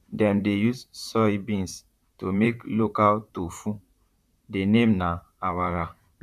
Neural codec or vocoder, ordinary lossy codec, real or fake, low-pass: vocoder, 44.1 kHz, 128 mel bands, Pupu-Vocoder; none; fake; 14.4 kHz